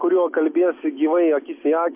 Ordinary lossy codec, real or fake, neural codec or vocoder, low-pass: MP3, 24 kbps; real; none; 3.6 kHz